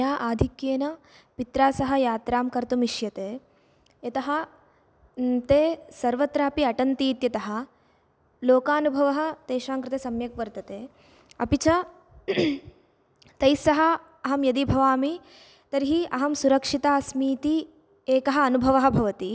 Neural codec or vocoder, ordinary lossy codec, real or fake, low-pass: none; none; real; none